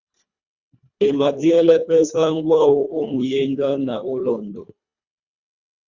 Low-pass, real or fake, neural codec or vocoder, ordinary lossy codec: 7.2 kHz; fake; codec, 24 kHz, 1.5 kbps, HILCodec; Opus, 64 kbps